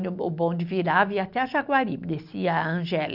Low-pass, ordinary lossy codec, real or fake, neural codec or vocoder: 5.4 kHz; none; real; none